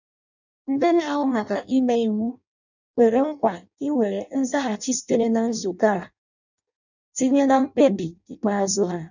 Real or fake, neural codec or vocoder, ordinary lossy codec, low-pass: fake; codec, 16 kHz in and 24 kHz out, 0.6 kbps, FireRedTTS-2 codec; none; 7.2 kHz